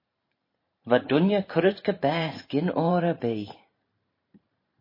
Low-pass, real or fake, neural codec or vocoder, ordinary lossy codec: 5.4 kHz; fake; vocoder, 44.1 kHz, 128 mel bands every 512 samples, BigVGAN v2; MP3, 24 kbps